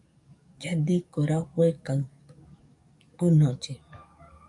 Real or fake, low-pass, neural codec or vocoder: fake; 10.8 kHz; codec, 44.1 kHz, 7.8 kbps, DAC